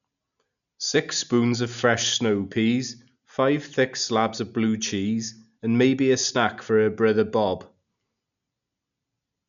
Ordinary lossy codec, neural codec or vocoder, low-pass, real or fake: none; none; 7.2 kHz; real